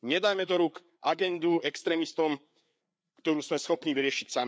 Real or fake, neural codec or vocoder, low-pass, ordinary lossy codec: fake; codec, 16 kHz, 4 kbps, FreqCodec, larger model; none; none